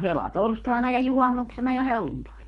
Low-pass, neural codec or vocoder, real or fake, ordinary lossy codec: 10.8 kHz; codec, 24 kHz, 3 kbps, HILCodec; fake; none